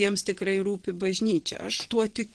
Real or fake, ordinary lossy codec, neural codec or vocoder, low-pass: fake; Opus, 16 kbps; vocoder, 22.05 kHz, 80 mel bands, WaveNeXt; 9.9 kHz